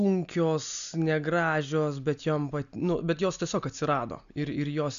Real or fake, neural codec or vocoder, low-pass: real; none; 7.2 kHz